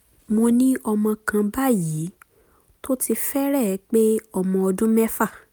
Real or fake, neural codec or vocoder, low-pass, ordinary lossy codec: real; none; none; none